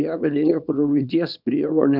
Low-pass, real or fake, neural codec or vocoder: 5.4 kHz; fake; codec, 24 kHz, 0.9 kbps, WavTokenizer, small release